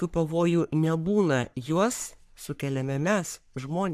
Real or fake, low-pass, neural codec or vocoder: fake; 14.4 kHz; codec, 44.1 kHz, 3.4 kbps, Pupu-Codec